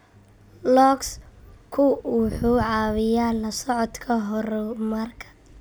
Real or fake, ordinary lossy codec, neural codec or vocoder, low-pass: real; none; none; none